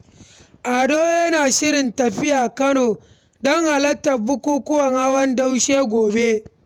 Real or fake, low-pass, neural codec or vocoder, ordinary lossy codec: fake; 19.8 kHz; vocoder, 48 kHz, 128 mel bands, Vocos; none